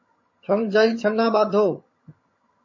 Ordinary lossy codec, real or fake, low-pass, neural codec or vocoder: MP3, 32 kbps; fake; 7.2 kHz; vocoder, 22.05 kHz, 80 mel bands, HiFi-GAN